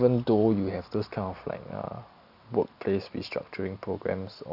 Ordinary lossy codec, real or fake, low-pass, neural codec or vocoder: none; real; 5.4 kHz; none